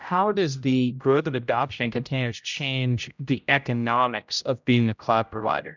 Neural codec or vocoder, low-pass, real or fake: codec, 16 kHz, 0.5 kbps, X-Codec, HuBERT features, trained on general audio; 7.2 kHz; fake